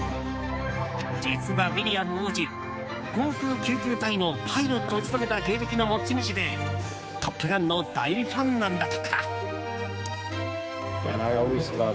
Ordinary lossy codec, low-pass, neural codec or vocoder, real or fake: none; none; codec, 16 kHz, 4 kbps, X-Codec, HuBERT features, trained on general audio; fake